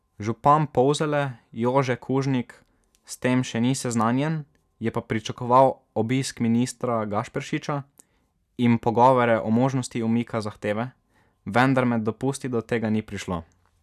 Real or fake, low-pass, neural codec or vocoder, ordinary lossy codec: real; 14.4 kHz; none; none